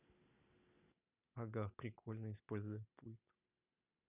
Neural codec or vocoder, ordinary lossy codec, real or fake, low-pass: none; none; real; 3.6 kHz